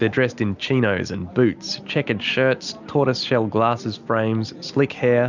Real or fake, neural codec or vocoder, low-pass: real; none; 7.2 kHz